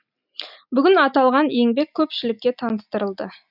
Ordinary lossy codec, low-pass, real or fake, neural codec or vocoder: none; 5.4 kHz; real; none